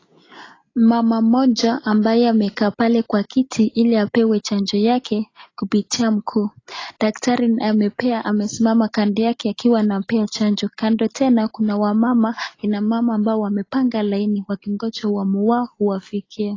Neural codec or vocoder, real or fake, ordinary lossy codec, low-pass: none; real; AAC, 32 kbps; 7.2 kHz